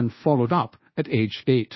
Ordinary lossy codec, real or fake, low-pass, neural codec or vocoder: MP3, 24 kbps; fake; 7.2 kHz; codec, 24 kHz, 1.2 kbps, DualCodec